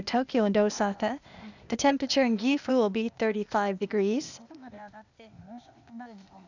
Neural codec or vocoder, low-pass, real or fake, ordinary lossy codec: codec, 16 kHz, 0.8 kbps, ZipCodec; 7.2 kHz; fake; none